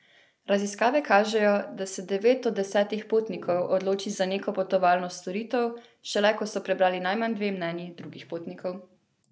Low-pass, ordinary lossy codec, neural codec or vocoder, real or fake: none; none; none; real